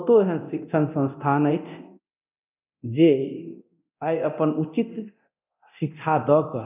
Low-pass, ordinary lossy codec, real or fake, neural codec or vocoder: 3.6 kHz; none; fake; codec, 24 kHz, 0.9 kbps, DualCodec